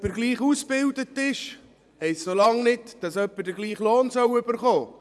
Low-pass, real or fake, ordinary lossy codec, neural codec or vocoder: none; fake; none; vocoder, 24 kHz, 100 mel bands, Vocos